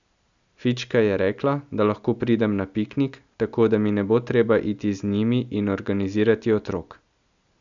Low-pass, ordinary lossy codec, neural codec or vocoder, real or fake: 7.2 kHz; none; none; real